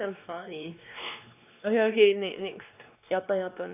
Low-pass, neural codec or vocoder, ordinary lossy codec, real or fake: 3.6 kHz; codec, 16 kHz, 2 kbps, X-Codec, WavLM features, trained on Multilingual LibriSpeech; none; fake